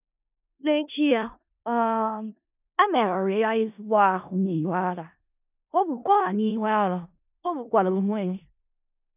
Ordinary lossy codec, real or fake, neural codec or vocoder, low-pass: none; fake; codec, 16 kHz in and 24 kHz out, 0.4 kbps, LongCat-Audio-Codec, four codebook decoder; 3.6 kHz